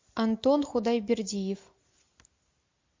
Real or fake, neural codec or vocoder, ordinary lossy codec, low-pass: real; none; MP3, 48 kbps; 7.2 kHz